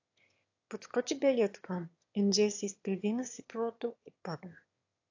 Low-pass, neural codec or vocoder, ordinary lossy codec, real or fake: 7.2 kHz; autoencoder, 22.05 kHz, a latent of 192 numbers a frame, VITS, trained on one speaker; AAC, 48 kbps; fake